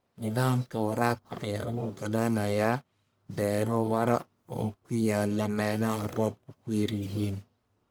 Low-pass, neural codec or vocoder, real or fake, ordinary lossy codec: none; codec, 44.1 kHz, 1.7 kbps, Pupu-Codec; fake; none